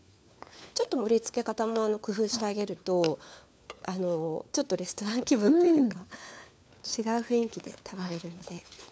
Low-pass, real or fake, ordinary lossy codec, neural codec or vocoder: none; fake; none; codec, 16 kHz, 4 kbps, FunCodec, trained on LibriTTS, 50 frames a second